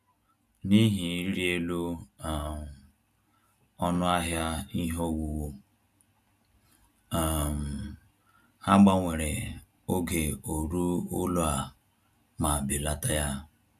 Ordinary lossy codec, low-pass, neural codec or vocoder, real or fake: none; 14.4 kHz; none; real